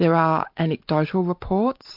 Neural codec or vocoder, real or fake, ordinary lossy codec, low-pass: none; real; MP3, 48 kbps; 5.4 kHz